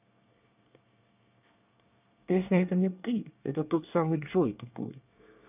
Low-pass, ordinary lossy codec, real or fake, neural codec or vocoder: 3.6 kHz; none; fake; codec, 24 kHz, 1 kbps, SNAC